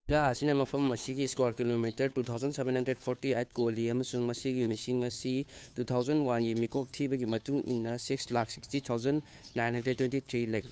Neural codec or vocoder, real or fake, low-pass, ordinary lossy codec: codec, 16 kHz, 2 kbps, FunCodec, trained on Chinese and English, 25 frames a second; fake; none; none